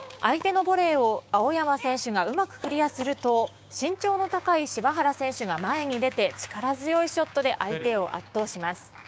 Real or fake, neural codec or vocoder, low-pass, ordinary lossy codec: fake; codec, 16 kHz, 6 kbps, DAC; none; none